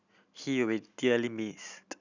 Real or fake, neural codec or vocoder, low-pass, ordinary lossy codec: real; none; 7.2 kHz; none